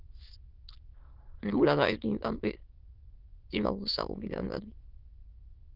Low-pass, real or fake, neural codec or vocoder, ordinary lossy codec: 5.4 kHz; fake; autoencoder, 22.05 kHz, a latent of 192 numbers a frame, VITS, trained on many speakers; Opus, 32 kbps